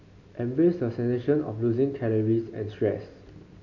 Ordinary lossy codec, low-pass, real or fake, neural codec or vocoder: none; 7.2 kHz; real; none